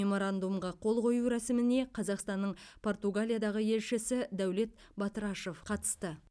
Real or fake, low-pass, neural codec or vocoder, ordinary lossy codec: real; none; none; none